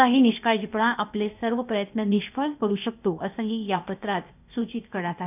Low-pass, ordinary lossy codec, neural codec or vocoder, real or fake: 3.6 kHz; none; codec, 16 kHz, 0.8 kbps, ZipCodec; fake